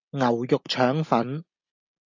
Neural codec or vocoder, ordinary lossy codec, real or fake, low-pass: none; AAC, 48 kbps; real; 7.2 kHz